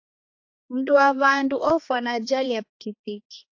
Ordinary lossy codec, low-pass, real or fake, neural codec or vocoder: AAC, 48 kbps; 7.2 kHz; fake; codec, 16 kHz, 2 kbps, X-Codec, HuBERT features, trained on balanced general audio